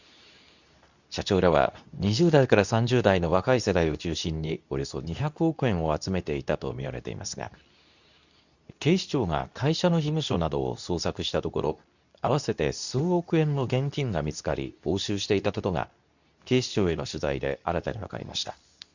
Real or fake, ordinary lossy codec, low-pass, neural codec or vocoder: fake; none; 7.2 kHz; codec, 24 kHz, 0.9 kbps, WavTokenizer, medium speech release version 2